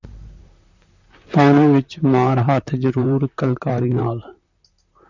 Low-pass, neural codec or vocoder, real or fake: 7.2 kHz; vocoder, 44.1 kHz, 128 mel bands, Pupu-Vocoder; fake